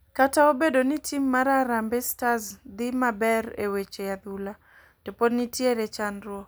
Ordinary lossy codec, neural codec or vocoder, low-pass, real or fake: none; none; none; real